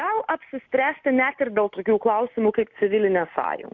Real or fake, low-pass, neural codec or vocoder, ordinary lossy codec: real; 7.2 kHz; none; MP3, 64 kbps